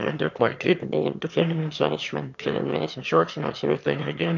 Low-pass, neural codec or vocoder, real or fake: 7.2 kHz; autoencoder, 22.05 kHz, a latent of 192 numbers a frame, VITS, trained on one speaker; fake